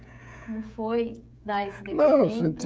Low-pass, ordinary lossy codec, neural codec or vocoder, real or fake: none; none; codec, 16 kHz, 16 kbps, FreqCodec, smaller model; fake